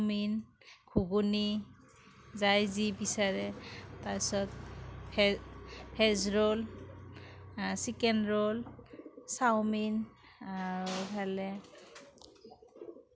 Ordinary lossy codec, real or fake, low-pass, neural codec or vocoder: none; real; none; none